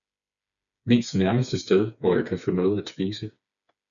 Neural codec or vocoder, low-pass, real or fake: codec, 16 kHz, 4 kbps, FreqCodec, smaller model; 7.2 kHz; fake